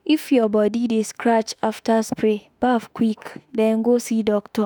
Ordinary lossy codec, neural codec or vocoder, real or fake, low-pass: none; autoencoder, 48 kHz, 32 numbers a frame, DAC-VAE, trained on Japanese speech; fake; none